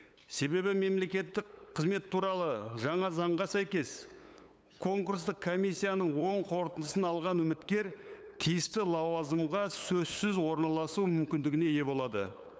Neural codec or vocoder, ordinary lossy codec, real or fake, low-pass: codec, 16 kHz, 8 kbps, FunCodec, trained on LibriTTS, 25 frames a second; none; fake; none